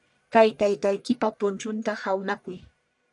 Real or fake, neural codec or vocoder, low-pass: fake; codec, 44.1 kHz, 1.7 kbps, Pupu-Codec; 10.8 kHz